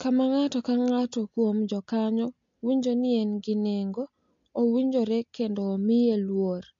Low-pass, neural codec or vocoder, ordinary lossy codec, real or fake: 7.2 kHz; none; MP3, 48 kbps; real